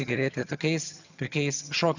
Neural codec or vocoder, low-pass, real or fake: vocoder, 22.05 kHz, 80 mel bands, HiFi-GAN; 7.2 kHz; fake